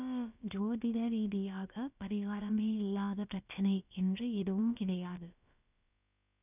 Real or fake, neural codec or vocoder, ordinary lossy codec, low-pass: fake; codec, 16 kHz, about 1 kbps, DyCAST, with the encoder's durations; none; 3.6 kHz